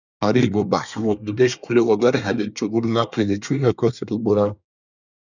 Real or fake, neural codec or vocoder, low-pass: fake; codec, 24 kHz, 1 kbps, SNAC; 7.2 kHz